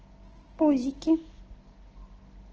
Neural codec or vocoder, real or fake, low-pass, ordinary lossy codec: none; real; 7.2 kHz; Opus, 16 kbps